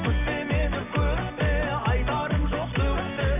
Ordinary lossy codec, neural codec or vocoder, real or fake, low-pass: none; none; real; 3.6 kHz